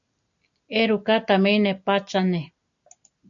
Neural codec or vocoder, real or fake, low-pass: none; real; 7.2 kHz